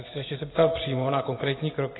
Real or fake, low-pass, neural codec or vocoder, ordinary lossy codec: real; 7.2 kHz; none; AAC, 16 kbps